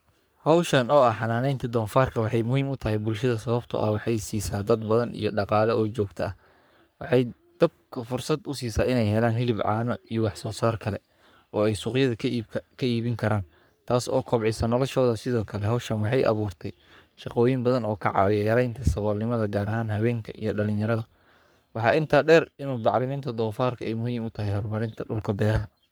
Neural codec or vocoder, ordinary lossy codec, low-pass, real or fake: codec, 44.1 kHz, 3.4 kbps, Pupu-Codec; none; none; fake